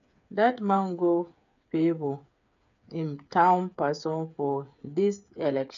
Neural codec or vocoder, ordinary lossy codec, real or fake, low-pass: codec, 16 kHz, 8 kbps, FreqCodec, smaller model; AAC, 96 kbps; fake; 7.2 kHz